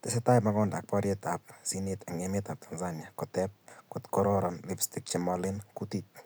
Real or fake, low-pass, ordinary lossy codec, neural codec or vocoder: real; none; none; none